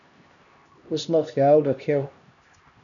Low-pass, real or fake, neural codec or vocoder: 7.2 kHz; fake; codec, 16 kHz, 1 kbps, X-Codec, HuBERT features, trained on LibriSpeech